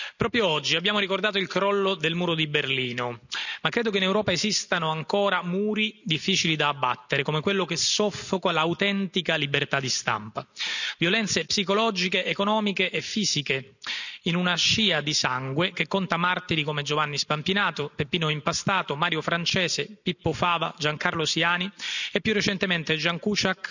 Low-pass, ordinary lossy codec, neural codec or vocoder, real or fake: 7.2 kHz; none; none; real